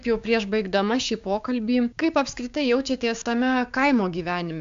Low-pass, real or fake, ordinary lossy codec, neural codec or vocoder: 7.2 kHz; fake; AAC, 64 kbps; codec, 16 kHz, 6 kbps, DAC